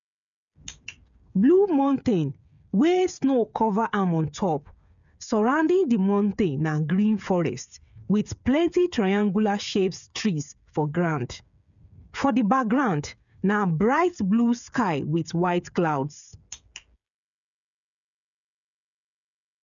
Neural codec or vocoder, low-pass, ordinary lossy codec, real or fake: codec, 16 kHz, 8 kbps, FreqCodec, smaller model; 7.2 kHz; MP3, 96 kbps; fake